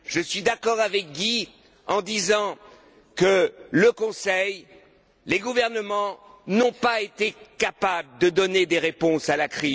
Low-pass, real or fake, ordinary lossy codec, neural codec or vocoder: none; real; none; none